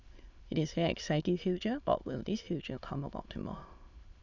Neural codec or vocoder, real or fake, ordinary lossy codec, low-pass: autoencoder, 22.05 kHz, a latent of 192 numbers a frame, VITS, trained on many speakers; fake; none; 7.2 kHz